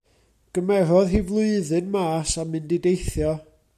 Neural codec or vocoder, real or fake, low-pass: none; real; 14.4 kHz